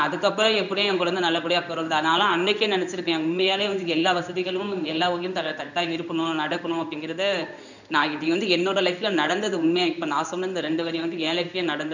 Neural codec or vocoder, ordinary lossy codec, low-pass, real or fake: codec, 16 kHz in and 24 kHz out, 1 kbps, XY-Tokenizer; none; 7.2 kHz; fake